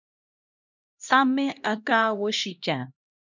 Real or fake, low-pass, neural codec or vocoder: fake; 7.2 kHz; codec, 16 kHz, 1 kbps, X-Codec, HuBERT features, trained on LibriSpeech